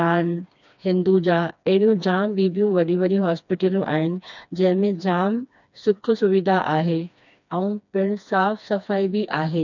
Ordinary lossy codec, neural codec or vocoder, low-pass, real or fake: none; codec, 16 kHz, 2 kbps, FreqCodec, smaller model; 7.2 kHz; fake